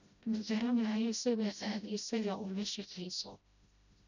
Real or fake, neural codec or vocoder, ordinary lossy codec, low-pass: fake; codec, 16 kHz, 0.5 kbps, FreqCodec, smaller model; none; 7.2 kHz